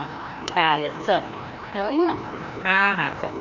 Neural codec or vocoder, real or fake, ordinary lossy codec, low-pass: codec, 16 kHz, 1 kbps, FreqCodec, larger model; fake; none; 7.2 kHz